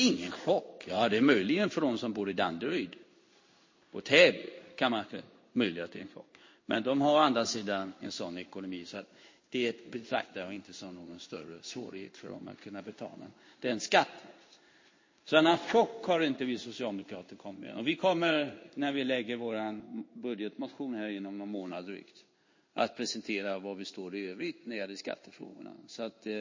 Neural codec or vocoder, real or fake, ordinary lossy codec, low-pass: codec, 16 kHz in and 24 kHz out, 1 kbps, XY-Tokenizer; fake; MP3, 32 kbps; 7.2 kHz